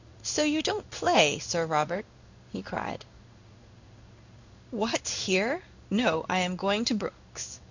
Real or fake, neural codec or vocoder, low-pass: fake; codec, 16 kHz in and 24 kHz out, 1 kbps, XY-Tokenizer; 7.2 kHz